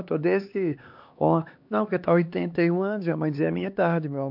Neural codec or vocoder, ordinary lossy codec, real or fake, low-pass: codec, 16 kHz, 2 kbps, X-Codec, HuBERT features, trained on LibriSpeech; MP3, 48 kbps; fake; 5.4 kHz